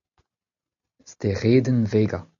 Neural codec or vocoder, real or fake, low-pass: none; real; 7.2 kHz